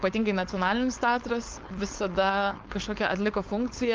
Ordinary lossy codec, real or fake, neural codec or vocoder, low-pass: Opus, 32 kbps; fake; codec, 16 kHz, 4.8 kbps, FACodec; 7.2 kHz